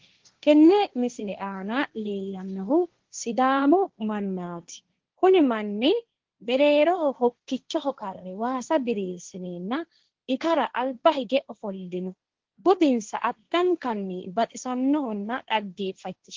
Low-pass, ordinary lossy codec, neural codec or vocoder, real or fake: 7.2 kHz; Opus, 16 kbps; codec, 16 kHz, 1.1 kbps, Voila-Tokenizer; fake